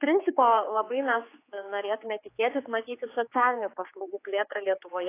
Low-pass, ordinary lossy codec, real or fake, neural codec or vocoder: 3.6 kHz; AAC, 24 kbps; fake; codec, 16 kHz, 4 kbps, X-Codec, HuBERT features, trained on general audio